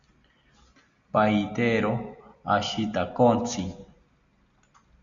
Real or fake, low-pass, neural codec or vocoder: real; 7.2 kHz; none